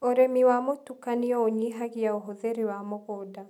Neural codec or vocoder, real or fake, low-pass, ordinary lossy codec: none; real; 19.8 kHz; none